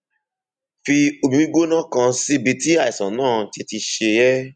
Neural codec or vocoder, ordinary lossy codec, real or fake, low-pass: none; none; real; 9.9 kHz